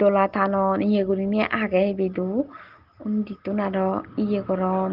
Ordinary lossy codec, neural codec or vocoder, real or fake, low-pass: Opus, 16 kbps; none; real; 5.4 kHz